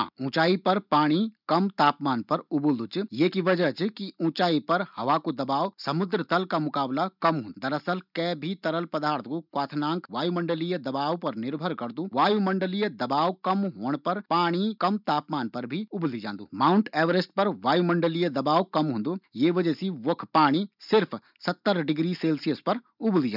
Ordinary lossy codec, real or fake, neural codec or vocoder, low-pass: none; real; none; 5.4 kHz